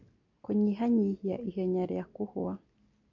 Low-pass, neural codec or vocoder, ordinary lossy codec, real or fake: 7.2 kHz; none; none; real